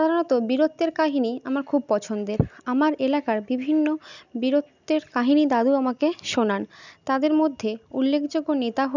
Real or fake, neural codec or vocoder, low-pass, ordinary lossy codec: real; none; 7.2 kHz; none